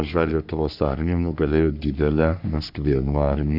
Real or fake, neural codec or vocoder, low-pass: fake; codec, 44.1 kHz, 3.4 kbps, Pupu-Codec; 5.4 kHz